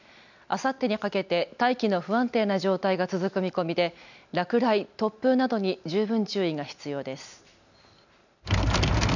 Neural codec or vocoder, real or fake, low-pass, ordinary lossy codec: none; real; 7.2 kHz; none